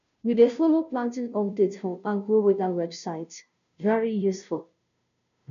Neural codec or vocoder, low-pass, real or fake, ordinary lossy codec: codec, 16 kHz, 0.5 kbps, FunCodec, trained on Chinese and English, 25 frames a second; 7.2 kHz; fake; none